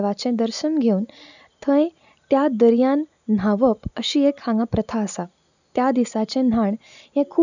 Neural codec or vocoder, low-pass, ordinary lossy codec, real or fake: none; 7.2 kHz; none; real